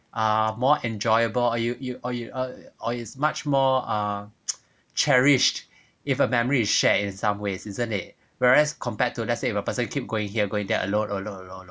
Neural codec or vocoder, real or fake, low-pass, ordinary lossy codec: none; real; none; none